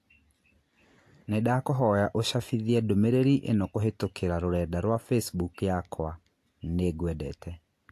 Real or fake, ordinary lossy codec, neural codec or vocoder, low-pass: real; AAC, 64 kbps; none; 14.4 kHz